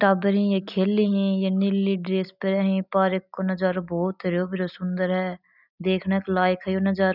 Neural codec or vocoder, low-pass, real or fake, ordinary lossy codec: none; 5.4 kHz; real; none